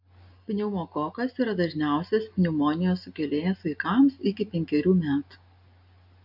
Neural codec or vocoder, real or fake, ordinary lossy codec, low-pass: none; real; AAC, 48 kbps; 5.4 kHz